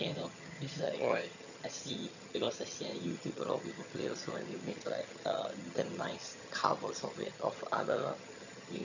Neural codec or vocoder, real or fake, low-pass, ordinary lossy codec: vocoder, 22.05 kHz, 80 mel bands, HiFi-GAN; fake; 7.2 kHz; none